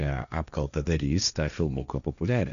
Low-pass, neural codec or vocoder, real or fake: 7.2 kHz; codec, 16 kHz, 1.1 kbps, Voila-Tokenizer; fake